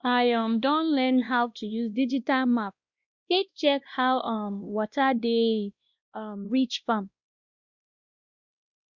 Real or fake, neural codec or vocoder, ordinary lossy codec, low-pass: fake; codec, 16 kHz, 1 kbps, X-Codec, WavLM features, trained on Multilingual LibriSpeech; Opus, 64 kbps; 7.2 kHz